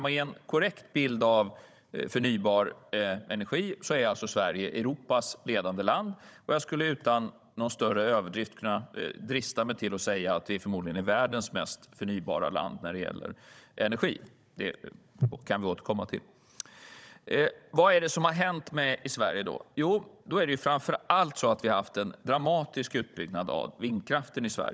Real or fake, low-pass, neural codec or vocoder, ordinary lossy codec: fake; none; codec, 16 kHz, 16 kbps, FunCodec, trained on Chinese and English, 50 frames a second; none